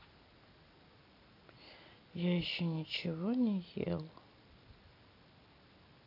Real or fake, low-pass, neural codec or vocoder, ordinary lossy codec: real; 5.4 kHz; none; AAC, 48 kbps